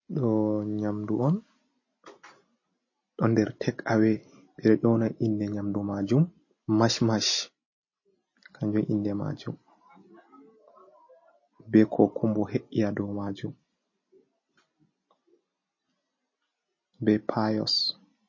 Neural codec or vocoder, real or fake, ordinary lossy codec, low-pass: none; real; MP3, 32 kbps; 7.2 kHz